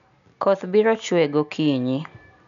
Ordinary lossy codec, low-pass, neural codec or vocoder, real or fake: none; 7.2 kHz; none; real